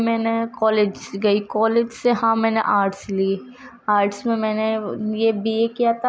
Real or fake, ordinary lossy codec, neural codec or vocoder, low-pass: real; none; none; none